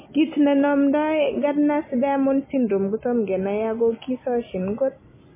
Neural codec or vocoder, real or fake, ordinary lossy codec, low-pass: none; real; MP3, 16 kbps; 3.6 kHz